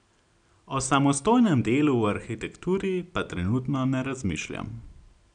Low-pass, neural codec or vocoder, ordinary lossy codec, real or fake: 9.9 kHz; none; none; real